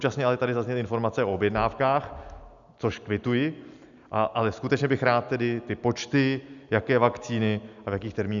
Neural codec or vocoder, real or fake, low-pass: none; real; 7.2 kHz